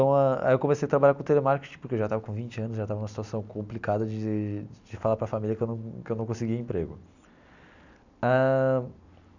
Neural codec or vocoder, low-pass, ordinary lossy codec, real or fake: none; 7.2 kHz; none; real